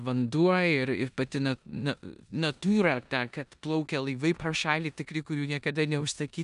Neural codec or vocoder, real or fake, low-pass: codec, 16 kHz in and 24 kHz out, 0.9 kbps, LongCat-Audio-Codec, four codebook decoder; fake; 10.8 kHz